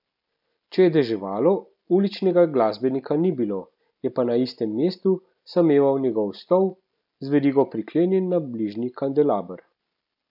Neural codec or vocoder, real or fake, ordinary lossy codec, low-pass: none; real; none; 5.4 kHz